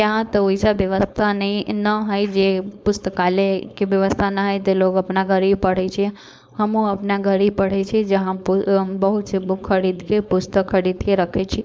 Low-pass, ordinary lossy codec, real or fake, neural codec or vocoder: none; none; fake; codec, 16 kHz, 4.8 kbps, FACodec